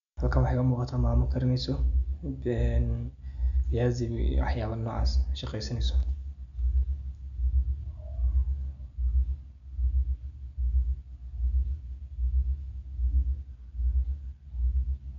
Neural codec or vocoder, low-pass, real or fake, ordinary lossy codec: none; 7.2 kHz; real; none